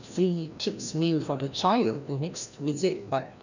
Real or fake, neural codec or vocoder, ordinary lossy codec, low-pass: fake; codec, 16 kHz, 1 kbps, FreqCodec, larger model; none; 7.2 kHz